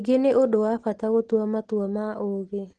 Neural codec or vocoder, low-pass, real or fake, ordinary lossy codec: none; 10.8 kHz; real; Opus, 16 kbps